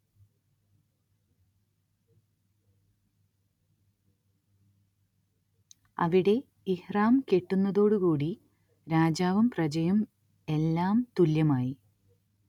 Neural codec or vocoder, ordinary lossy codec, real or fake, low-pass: none; none; real; 19.8 kHz